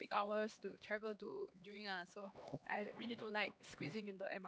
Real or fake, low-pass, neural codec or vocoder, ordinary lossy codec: fake; none; codec, 16 kHz, 2 kbps, X-Codec, HuBERT features, trained on LibriSpeech; none